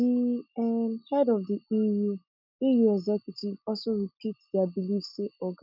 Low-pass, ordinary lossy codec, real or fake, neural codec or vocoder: 5.4 kHz; none; real; none